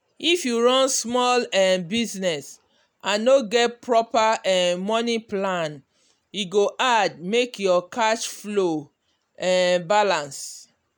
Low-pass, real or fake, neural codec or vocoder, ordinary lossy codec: none; real; none; none